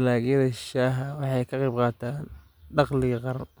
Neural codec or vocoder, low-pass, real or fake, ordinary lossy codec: none; none; real; none